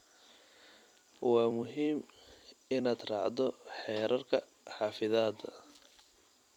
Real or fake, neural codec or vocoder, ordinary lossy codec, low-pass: fake; vocoder, 44.1 kHz, 128 mel bands every 256 samples, BigVGAN v2; none; 19.8 kHz